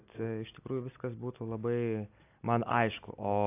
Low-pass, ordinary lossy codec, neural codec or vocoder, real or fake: 3.6 kHz; AAC, 24 kbps; none; real